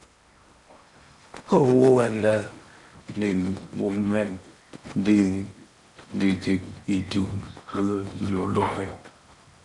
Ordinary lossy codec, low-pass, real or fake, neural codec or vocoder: MP3, 96 kbps; 10.8 kHz; fake; codec, 16 kHz in and 24 kHz out, 0.8 kbps, FocalCodec, streaming, 65536 codes